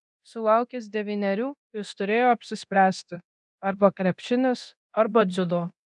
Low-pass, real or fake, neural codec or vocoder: 10.8 kHz; fake; codec, 24 kHz, 0.9 kbps, DualCodec